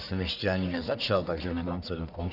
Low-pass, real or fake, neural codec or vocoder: 5.4 kHz; fake; codec, 44.1 kHz, 1.7 kbps, Pupu-Codec